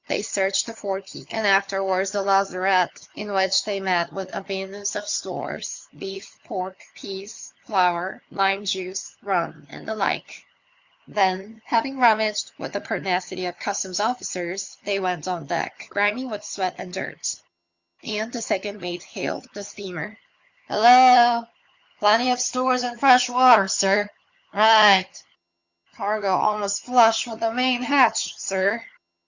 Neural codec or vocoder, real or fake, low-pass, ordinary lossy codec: vocoder, 22.05 kHz, 80 mel bands, HiFi-GAN; fake; 7.2 kHz; Opus, 64 kbps